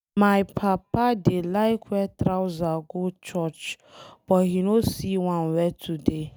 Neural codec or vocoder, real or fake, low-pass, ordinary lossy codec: none; real; none; none